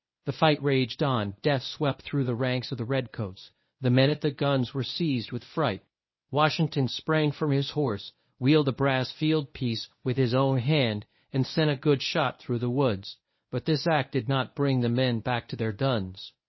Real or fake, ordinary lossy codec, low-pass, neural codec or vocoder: fake; MP3, 24 kbps; 7.2 kHz; codec, 24 kHz, 0.9 kbps, WavTokenizer, medium speech release version 1